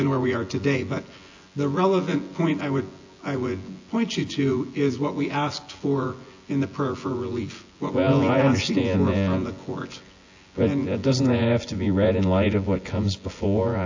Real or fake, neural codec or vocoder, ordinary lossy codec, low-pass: fake; vocoder, 24 kHz, 100 mel bands, Vocos; AAC, 48 kbps; 7.2 kHz